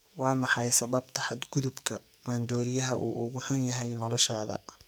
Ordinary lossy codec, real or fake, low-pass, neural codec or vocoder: none; fake; none; codec, 44.1 kHz, 2.6 kbps, SNAC